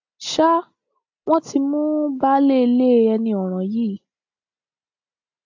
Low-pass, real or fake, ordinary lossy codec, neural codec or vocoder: 7.2 kHz; real; AAC, 48 kbps; none